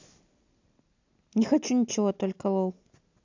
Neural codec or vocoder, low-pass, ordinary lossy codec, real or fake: none; 7.2 kHz; AAC, 48 kbps; real